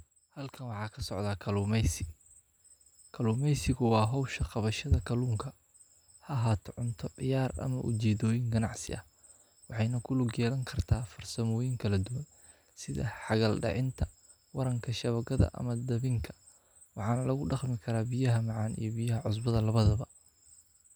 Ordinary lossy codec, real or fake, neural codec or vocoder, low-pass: none; real; none; none